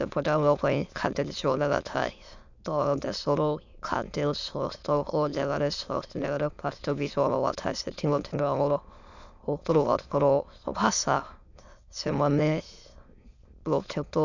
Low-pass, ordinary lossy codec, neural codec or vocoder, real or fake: 7.2 kHz; none; autoencoder, 22.05 kHz, a latent of 192 numbers a frame, VITS, trained on many speakers; fake